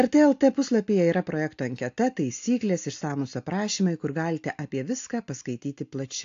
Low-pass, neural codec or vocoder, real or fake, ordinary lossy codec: 7.2 kHz; none; real; AAC, 48 kbps